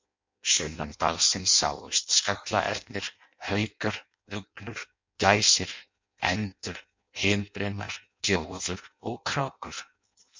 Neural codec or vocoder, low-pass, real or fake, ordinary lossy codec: codec, 16 kHz in and 24 kHz out, 0.6 kbps, FireRedTTS-2 codec; 7.2 kHz; fake; MP3, 64 kbps